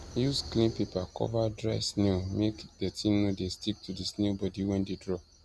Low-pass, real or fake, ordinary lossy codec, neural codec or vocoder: none; real; none; none